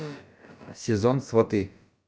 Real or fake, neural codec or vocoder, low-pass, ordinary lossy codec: fake; codec, 16 kHz, about 1 kbps, DyCAST, with the encoder's durations; none; none